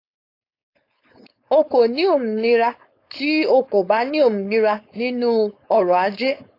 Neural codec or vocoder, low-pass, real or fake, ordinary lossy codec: codec, 16 kHz, 4.8 kbps, FACodec; 5.4 kHz; fake; AAC, 32 kbps